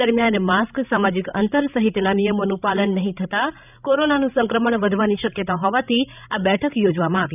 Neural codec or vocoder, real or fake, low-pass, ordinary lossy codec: codec, 16 kHz, 16 kbps, FreqCodec, larger model; fake; 3.6 kHz; none